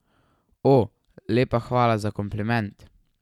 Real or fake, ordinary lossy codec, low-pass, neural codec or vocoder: real; none; 19.8 kHz; none